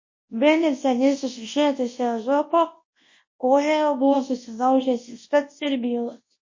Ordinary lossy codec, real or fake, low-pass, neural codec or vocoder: MP3, 32 kbps; fake; 7.2 kHz; codec, 24 kHz, 0.9 kbps, WavTokenizer, large speech release